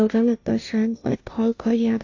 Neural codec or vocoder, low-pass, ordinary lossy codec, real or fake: codec, 16 kHz, 0.5 kbps, FunCodec, trained on Chinese and English, 25 frames a second; 7.2 kHz; AAC, 48 kbps; fake